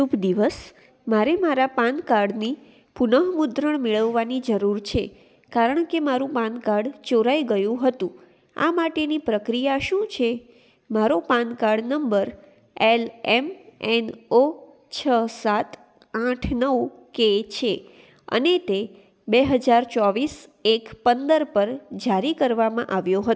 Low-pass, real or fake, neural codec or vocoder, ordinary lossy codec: none; real; none; none